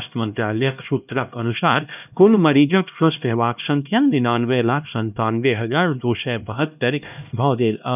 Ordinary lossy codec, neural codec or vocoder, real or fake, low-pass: none; codec, 16 kHz, 1 kbps, X-Codec, HuBERT features, trained on LibriSpeech; fake; 3.6 kHz